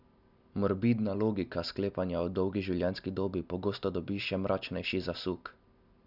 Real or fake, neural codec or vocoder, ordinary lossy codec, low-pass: real; none; none; 5.4 kHz